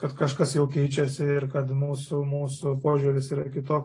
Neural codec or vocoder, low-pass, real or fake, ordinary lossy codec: none; 10.8 kHz; real; AAC, 32 kbps